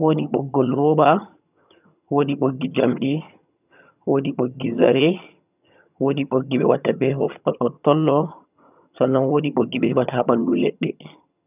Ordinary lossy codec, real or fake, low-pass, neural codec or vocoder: none; fake; 3.6 kHz; vocoder, 22.05 kHz, 80 mel bands, HiFi-GAN